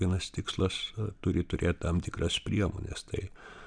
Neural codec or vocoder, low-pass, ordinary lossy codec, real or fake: none; 9.9 kHz; MP3, 96 kbps; real